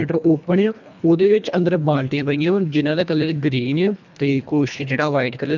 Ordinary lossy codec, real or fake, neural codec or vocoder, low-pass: none; fake; codec, 24 kHz, 1.5 kbps, HILCodec; 7.2 kHz